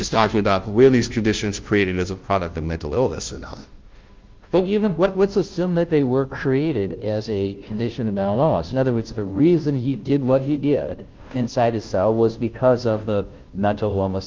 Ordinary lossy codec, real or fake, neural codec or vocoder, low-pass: Opus, 24 kbps; fake; codec, 16 kHz, 0.5 kbps, FunCodec, trained on Chinese and English, 25 frames a second; 7.2 kHz